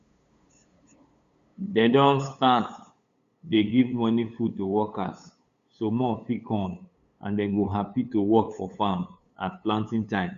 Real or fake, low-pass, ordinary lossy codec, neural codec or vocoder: fake; 7.2 kHz; Opus, 64 kbps; codec, 16 kHz, 8 kbps, FunCodec, trained on LibriTTS, 25 frames a second